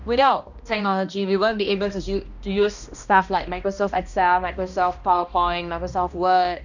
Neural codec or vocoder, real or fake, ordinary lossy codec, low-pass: codec, 16 kHz, 1 kbps, X-Codec, HuBERT features, trained on balanced general audio; fake; none; 7.2 kHz